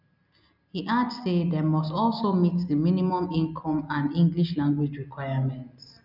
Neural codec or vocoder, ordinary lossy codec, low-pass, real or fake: none; none; 5.4 kHz; real